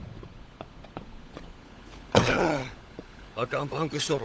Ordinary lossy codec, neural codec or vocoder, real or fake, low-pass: none; codec, 16 kHz, 16 kbps, FunCodec, trained on LibriTTS, 50 frames a second; fake; none